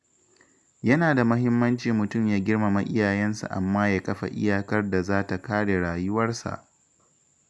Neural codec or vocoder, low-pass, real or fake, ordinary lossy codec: none; none; real; none